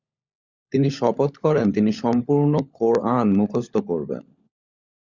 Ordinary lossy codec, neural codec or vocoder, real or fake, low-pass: Opus, 64 kbps; codec, 16 kHz, 16 kbps, FunCodec, trained on LibriTTS, 50 frames a second; fake; 7.2 kHz